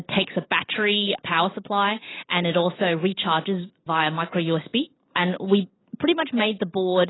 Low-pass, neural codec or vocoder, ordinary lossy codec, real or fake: 7.2 kHz; none; AAC, 16 kbps; real